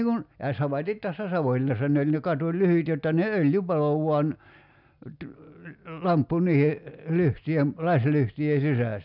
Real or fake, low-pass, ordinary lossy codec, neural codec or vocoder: real; 5.4 kHz; none; none